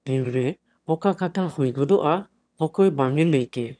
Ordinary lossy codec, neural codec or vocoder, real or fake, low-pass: none; autoencoder, 22.05 kHz, a latent of 192 numbers a frame, VITS, trained on one speaker; fake; 9.9 kHz